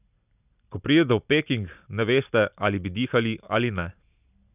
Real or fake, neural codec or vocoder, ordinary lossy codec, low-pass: real; none; none; 3.6 kHz